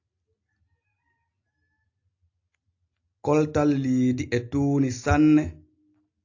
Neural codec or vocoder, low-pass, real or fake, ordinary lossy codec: none; 7.2 kHz; real; AAC, 48 kbps